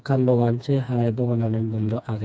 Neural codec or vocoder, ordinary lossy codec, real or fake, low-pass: codec, 16 kHz, 2 kbps, FreqCodec, smaller model; none; fake; none